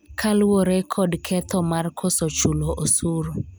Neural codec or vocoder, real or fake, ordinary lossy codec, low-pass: none; real; none; none